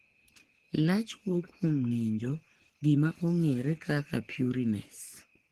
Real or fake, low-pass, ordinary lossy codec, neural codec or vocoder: fake; 14.4 kHz; Opus, 16 kbps; codec, 44.1 kHz, 3.4 kbps, Pupu-Codec